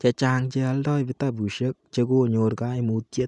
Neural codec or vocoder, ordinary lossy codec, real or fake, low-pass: none; none; real; 10.8 kHz